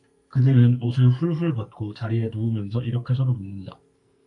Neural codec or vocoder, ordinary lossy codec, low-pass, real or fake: codec, 44.1 kHz, 2.6 kbps, SNAC; AAC, 64 kbps; 10.8 kHz; fake